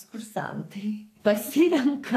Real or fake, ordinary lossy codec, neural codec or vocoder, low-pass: fake; AAC, 64 kbps; codec, 44.1 kHz, 2.6 kbps, SNAC; 14.4 kHz